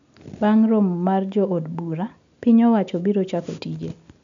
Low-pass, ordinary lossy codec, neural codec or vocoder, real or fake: 7.2 kHz; MP3, 64 kbps; none; real